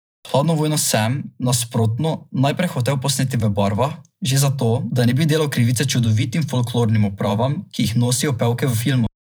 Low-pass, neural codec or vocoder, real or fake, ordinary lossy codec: none; vocoder, 44.1 kHz, 128 mel bands every 512 samples, BigVGAN v2; fake; none